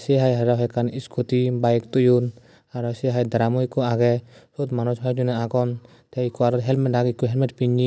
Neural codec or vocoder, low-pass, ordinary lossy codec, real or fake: none; none; none; real